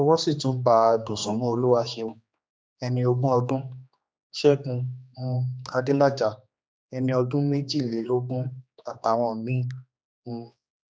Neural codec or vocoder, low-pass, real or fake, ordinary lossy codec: codec, 16 kHz, 2 kbps, X-Codec, HuBERT features, trained on general audio; none; fake; none